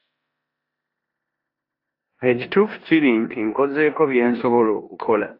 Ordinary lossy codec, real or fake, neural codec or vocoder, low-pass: AAC, 32 kbps; fake; codec, 16 kHz in and 24 kHz out, 0.9 kbps, LongCat-Audio-Codec, four codebook decoder; 5.4 kHz